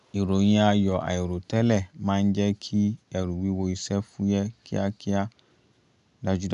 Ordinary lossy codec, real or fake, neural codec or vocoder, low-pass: none; real; none; 10.8 kHz